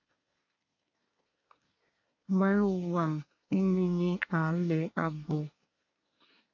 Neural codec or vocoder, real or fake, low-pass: codec, 24 kHz, 1 kbps, SNAC; fake; 7.2 kHz